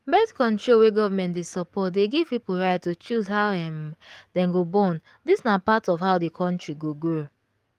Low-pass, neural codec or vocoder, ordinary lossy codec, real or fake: 14.4 kHz; codec, 44.1 kHz, 7.8 kbps, DAC; Opus, 32 kbps; fake